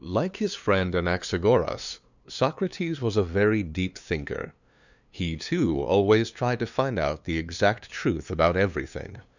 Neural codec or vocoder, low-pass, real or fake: codec, 16 kHz, 2 kbps, FunCodec, trained on LibriTTS, 25 frames a second; 7.2 kHz; fake